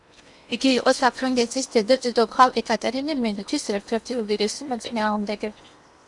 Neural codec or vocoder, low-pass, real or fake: codec, 16 kHz in and 24 kHz out, 0.8 kbps, FocalCodec, streaming, 65536 codes; 10.8 kHz; fake